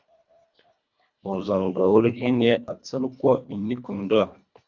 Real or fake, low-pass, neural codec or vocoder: fake; 7.2 kHz; codec, 24 kHz, 1.5 kbps, HILCodec